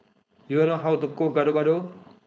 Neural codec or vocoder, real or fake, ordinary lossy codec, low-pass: codec, 16 kHz, 4.8 kbps, FACodec; fake; none; none